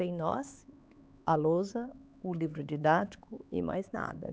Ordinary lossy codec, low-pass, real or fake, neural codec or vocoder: none; none; fake; codec, 16 kHz, 4 kbps, X-Codec, HuBERT features, trained on LibriSpeech